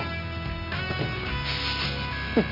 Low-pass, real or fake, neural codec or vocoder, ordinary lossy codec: 5.4 kHz; real; none; none